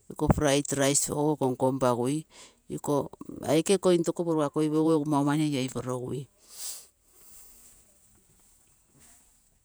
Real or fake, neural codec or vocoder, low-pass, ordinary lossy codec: fake; vocoder, 48 kHz, 128 mel bands, Vocos; none; none